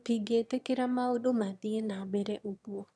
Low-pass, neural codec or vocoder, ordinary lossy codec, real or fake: none; autoencoder, 22.05 kHz, a latent of 192 numbers a frame, VITS, trained on one speaker; none; fake